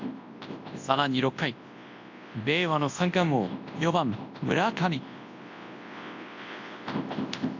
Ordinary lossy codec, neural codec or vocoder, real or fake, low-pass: AAC, 48 kbps; codec, 24 kHz, 0.9 kbps, WavTokenizer, large speech release; fake; 7.2 kHz